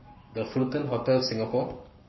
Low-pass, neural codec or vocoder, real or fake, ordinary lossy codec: 7.2 kHz; codec, 44.1 kHz, 7.8 kbps, Pupu-Codec; fake; MP3, 24 kbps